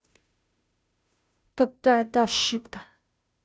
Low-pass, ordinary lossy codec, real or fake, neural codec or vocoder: none; none; fake; codec, 16 kHz, 0.5 kbps, FunCodec, trained on Chinese and English, 25 frames a second